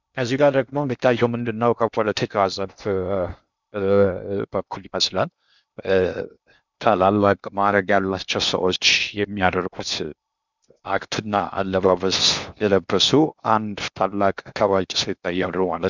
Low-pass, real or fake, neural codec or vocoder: 7.2 kHz; fake; codec, 16 kHz in and 24 kHz out, 0.6 kbps, FocalCodec, streaming, 4096 codes